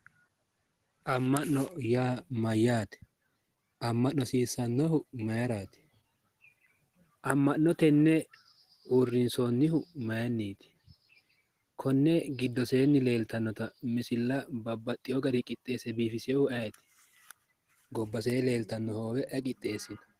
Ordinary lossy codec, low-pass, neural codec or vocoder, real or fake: Opus, 16 kbps; 14.4 kHz; none; real